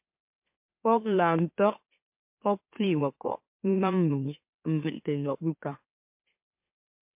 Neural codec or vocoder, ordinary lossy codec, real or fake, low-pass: autoencoder, 44.1 kHz, a latent of 192 numbers a frame, MeloTTS; MP3, 32 kbps; fake; 3.6 kHz